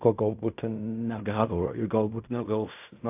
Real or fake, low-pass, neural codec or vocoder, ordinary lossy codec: fake; 3.6 kHz; codec, 16 kHz in and 24 kHz out, 0.4 kbps, LongCat-Audio-Codec, fine tuned four codebook decoder; AAC, 32 kbps